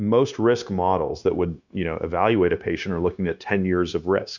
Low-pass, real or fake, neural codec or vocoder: 7.2 kHz; fake; codec, 16 kHz, 0.9 kbps, LongCat-Audio-Codec